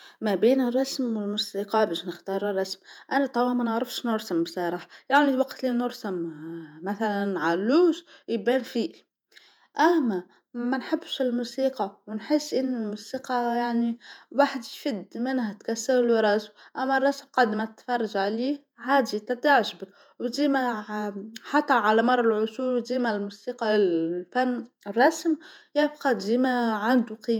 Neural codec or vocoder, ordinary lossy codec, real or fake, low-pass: vocoder, 48 kHz, 128 mel bands, Vocos; none; fake; 19.8 kHz